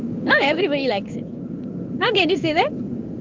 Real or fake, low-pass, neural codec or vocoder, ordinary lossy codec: fake; 7.2 kHz; codec, 16 kHz in and 24 kHz out, 1 kbps, XY-Tokenizer; Opus, 24 kbps